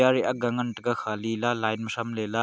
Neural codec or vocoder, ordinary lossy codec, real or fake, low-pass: none; none; real; none